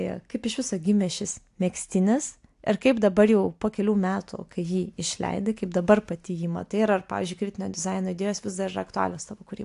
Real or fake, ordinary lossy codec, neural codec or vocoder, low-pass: real; AAC, 64 kbps; none; 10.8 kHz